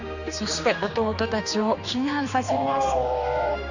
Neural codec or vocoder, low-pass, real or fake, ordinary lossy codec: codec, 16 kHz, 2 kbps, X-Codec, HuBERT features, trained on general audio; 7.2 kHz; fake; none